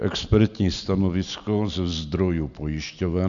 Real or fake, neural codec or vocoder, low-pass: real; none; 7.2 kHz